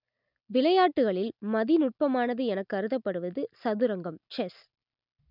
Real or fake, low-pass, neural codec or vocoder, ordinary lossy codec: real; 5.4 kHz; none; none